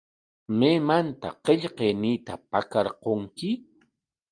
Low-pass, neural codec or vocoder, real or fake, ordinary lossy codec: 9.9 kHz; none; real; Opus, 32 kbps